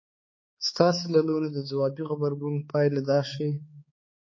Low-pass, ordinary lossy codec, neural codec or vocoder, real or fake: 7.2 kHz; MP3, 32 kbps; codec, 16 kHz, 4 kbps, X-Codec, HuBERT features, trained on balanced general audio; fake